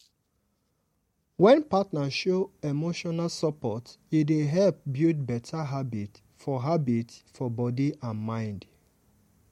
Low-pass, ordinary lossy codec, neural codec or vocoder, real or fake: 19.8 kHz; MP3, 64 kbps; none; real